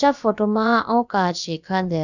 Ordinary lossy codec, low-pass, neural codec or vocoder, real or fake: none; 7.2 kHz; codec, 16 kHz, about 1 kbps, DyCAST, with the encoder's durations; fake